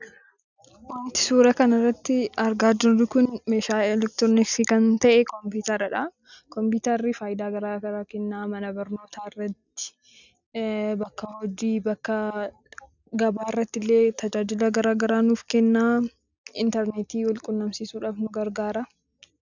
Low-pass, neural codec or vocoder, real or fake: 7.2 kHz; none; real